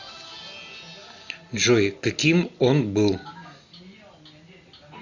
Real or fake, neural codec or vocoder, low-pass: real; none; 7.2 kHz